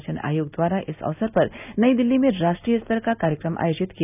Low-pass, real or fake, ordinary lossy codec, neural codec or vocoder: 3.6 kHz; real; none; none